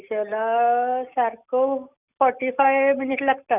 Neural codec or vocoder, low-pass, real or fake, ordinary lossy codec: none; 3.6 kHz; real; none